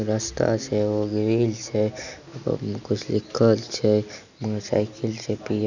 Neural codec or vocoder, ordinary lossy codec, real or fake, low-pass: none; none; real; 7.2 kHz